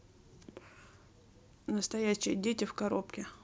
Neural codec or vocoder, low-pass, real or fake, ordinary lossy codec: none; none; real; none